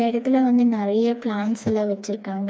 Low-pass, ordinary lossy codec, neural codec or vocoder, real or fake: none; none; codec, 16 kHz, 2 kbps, FreqCodec, smaller model; fake